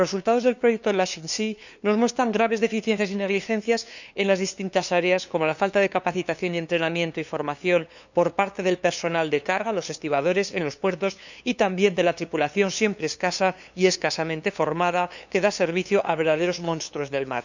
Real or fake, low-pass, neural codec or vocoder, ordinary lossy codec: fake; 7.2 kHz; codec, 16 kHz, 2 kbps, FunCodec, trained on LibriTTS, 25 frames a second; none